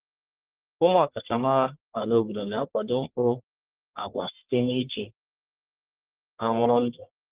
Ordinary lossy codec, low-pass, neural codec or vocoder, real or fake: Opus, 16 kbps; 3.6 kHz; codec, 44.1 kHz, 1.7 kbps, Pupu-Codec; fake